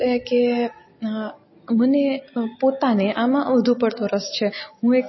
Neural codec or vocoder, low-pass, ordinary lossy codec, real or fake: none; 7.2 kHz; MP3, 24 kbps; real